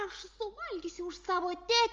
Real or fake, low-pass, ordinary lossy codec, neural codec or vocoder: real; 7.2 kHz; Opus, 32 kbps; none